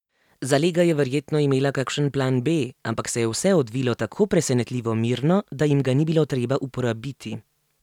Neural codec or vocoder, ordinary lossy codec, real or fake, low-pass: none; none; real; 19.8 kHz